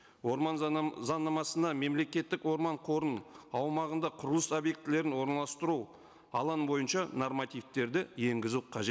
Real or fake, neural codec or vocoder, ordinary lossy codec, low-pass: real; none; none; none